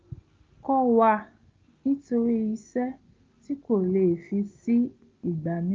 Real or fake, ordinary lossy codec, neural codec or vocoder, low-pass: real; Opus, 24 kbps; none; 7.2 kHz